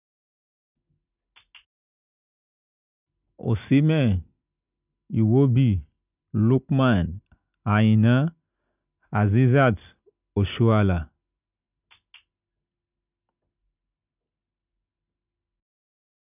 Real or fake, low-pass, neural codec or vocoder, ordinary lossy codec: real; 3.6 kHz; none; none